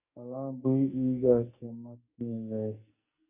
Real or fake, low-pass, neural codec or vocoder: fake; 3.6 kHz; codec, 44.1 kHz, 2.6 kbps, SNAC